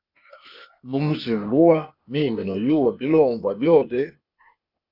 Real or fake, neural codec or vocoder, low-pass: fake; codec, 16 kHz, 0.8 kbps, ZipCodec; 5.4 kHz